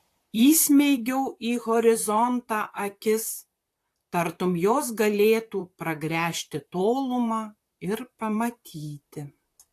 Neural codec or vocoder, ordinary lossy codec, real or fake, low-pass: vocoder, 44.1 kHz, 128 mel bands, Pupu-Vocoder; AAC, 64 kbps; fake; 14.4 kHz